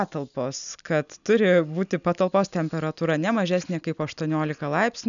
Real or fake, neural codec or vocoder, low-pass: real; none; 7.2 kHz